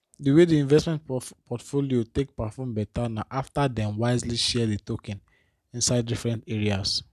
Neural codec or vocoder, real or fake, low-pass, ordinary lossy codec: none; real; 14.4 kHz; none